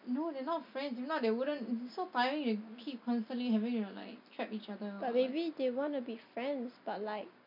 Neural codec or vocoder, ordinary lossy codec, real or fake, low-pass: none; none; real; 5.4 kHz